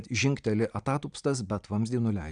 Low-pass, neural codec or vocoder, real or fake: 9.9 kHz; none; real